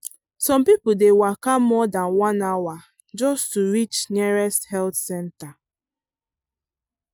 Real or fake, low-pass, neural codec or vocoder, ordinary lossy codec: real; none; none; none